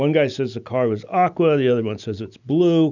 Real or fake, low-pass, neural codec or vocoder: real; 7.2 kHz; none